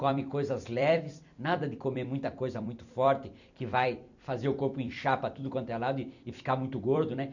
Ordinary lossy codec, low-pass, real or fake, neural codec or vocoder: none; 7.2 kHz; fake; vocoder, 44.1 kHz, 128 mel bands every 256 samples, BigVGAN v2